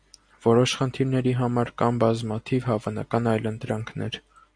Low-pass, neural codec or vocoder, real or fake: 9.9 kHz; none; real